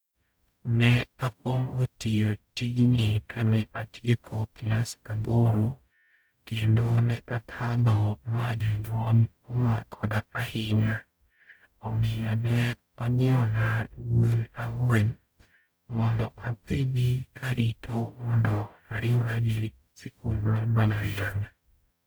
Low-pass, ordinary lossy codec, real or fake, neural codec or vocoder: none; none; fake; codec, 44.1 kHz, 0.9 kbps, DAC